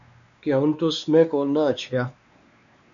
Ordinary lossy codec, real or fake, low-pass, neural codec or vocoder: MP3, 96 kbps; fake; 7.2 kHz; codec, 16 kHz, 2 kbps, X-Codec, WavLM features, trained on Multilingual LibriSpeech